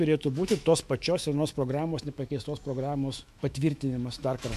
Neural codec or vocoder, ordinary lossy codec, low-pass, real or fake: autoencoder, 48 kHz, 128 numbers a frame, DAC-VAE, trained on Japanese speech; MP3, 96 kbps; 14.4 kHz; fake